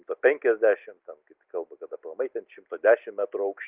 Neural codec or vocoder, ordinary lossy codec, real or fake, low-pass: none; Opus, 24 kbps; real; 3.6 kHz